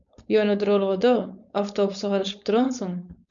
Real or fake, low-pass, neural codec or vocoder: fake; 7.2 kHz; codec, 16 kHz, 4.8 kbps, FACodec